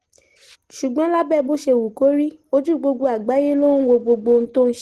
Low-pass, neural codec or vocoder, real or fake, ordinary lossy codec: 14.4 kHz; none; real; Opus, 16 kbps